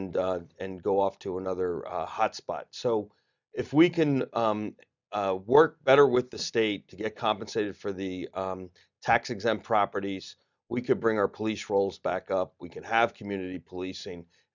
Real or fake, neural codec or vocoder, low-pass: real; none; 7.2 kHz